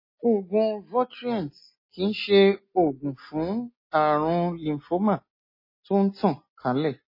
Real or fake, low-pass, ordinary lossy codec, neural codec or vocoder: real; 5.4 kHz; MP3, 24 kbps; none